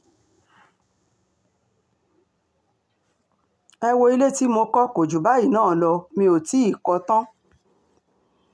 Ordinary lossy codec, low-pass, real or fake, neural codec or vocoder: none; none; real; none